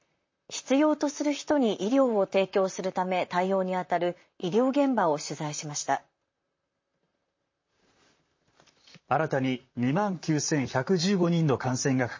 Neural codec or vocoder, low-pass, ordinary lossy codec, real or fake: vocoder, 44.1 kHz, 128 mel bands, Pupu-Vocoder; 7.2 kHz; MP3, 32 kbps; fake